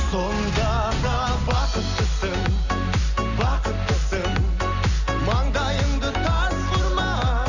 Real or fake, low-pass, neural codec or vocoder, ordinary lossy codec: real; 7.2 kHz; none; none